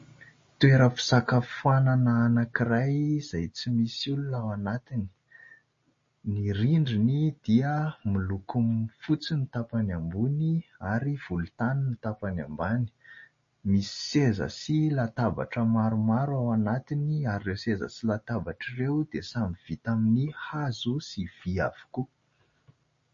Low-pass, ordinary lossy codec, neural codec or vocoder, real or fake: 7.2 kHz; MP3, 32 kbps; none; real